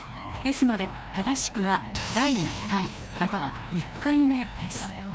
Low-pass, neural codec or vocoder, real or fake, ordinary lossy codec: none; codec, 16 kHz, 1 kbps, FreqCodec, larger model; fake; none